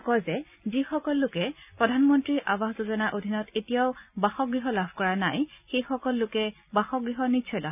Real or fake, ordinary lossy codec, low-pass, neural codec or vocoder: real; none; 3.6 kHz; none